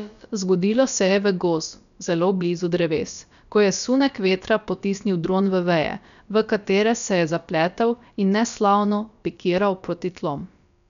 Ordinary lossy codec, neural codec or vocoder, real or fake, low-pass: none; codec, 16 kHz, about 1 kbps, DyCAST, with the encoder's durations; fake; 7.2 kHz